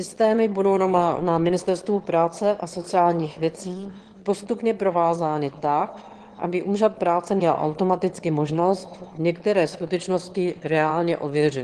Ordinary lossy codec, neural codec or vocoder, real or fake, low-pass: Opus, 16 kbps; autoencoder, 22.05 kHz, a latent of 192 numbers a frame, VITS, trained on one speaker; fake; 9.9 kHz